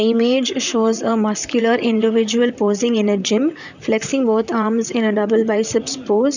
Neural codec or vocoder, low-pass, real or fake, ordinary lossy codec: vocoder, 44.1 kHz, 128 mel bands, Pupu-Vocoder; 7.2 kHz; fake; none